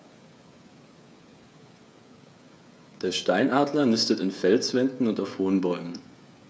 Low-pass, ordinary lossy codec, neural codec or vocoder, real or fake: none; none; codec, 16 kHz, 16 kbps, FreqCodec, smaller model; fake